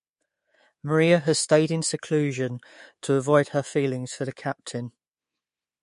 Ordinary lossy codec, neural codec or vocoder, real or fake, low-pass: MP3, 48 kbps; codec, 24 kHz, 3.1 kbps, DualCodec; fake; 10.8 kHz